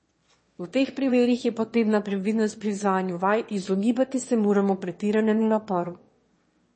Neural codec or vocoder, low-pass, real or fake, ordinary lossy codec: autoencoder, 22.05 kHz, a latent of 192 numbers a frame, VITS, trained on one speaker; 9.9 kHz; fake; MP3, 32 kbps